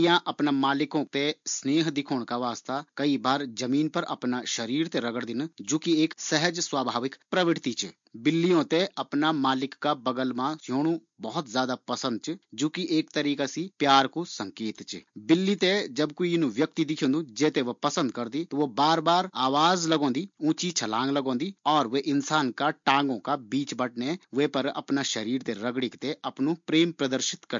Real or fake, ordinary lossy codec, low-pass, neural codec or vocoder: real; MP3, 48 kbps; 7.2 kHz; none